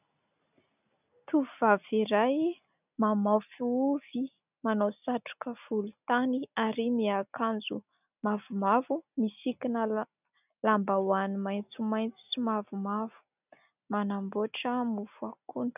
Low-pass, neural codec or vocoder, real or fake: 3.6 kHz; none; real